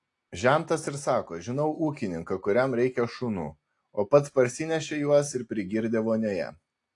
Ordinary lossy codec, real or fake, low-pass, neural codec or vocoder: AAC, 48 kbps; real; 10.8 kHz; none